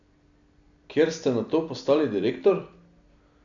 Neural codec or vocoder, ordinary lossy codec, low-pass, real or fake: none; MP3, 96 kbps; 7.2 kHz; real